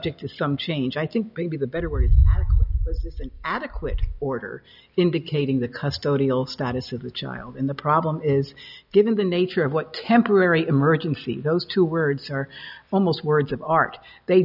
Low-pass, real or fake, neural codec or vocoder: 5.4 kHz; real; none